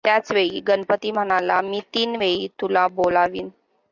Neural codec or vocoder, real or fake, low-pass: none; real; 7.2 kHz